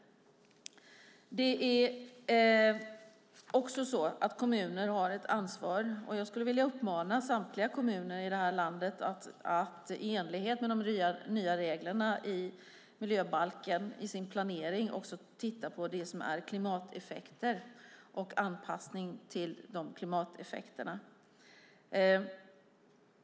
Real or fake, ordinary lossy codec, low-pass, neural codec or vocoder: real; none; none; none